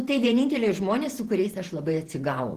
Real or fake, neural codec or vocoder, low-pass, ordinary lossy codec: fake; vocoder, 48 kHz, 128 mel bands, Vocos; 14.4 kHz; Opus, 16 kbps